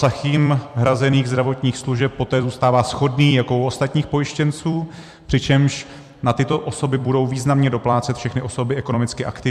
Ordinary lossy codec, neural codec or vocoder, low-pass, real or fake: MP3, 96 kbps; vocoder, 44.1 kHz, 128 mel bands every 256 samples, BigVGAN v2; 14.4 kHz; fake